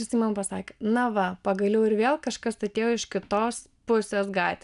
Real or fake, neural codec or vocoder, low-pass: real; none; 10.8 kHz